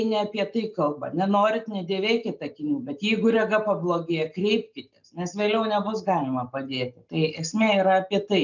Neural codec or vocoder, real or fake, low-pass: none; real; 7.2 kHz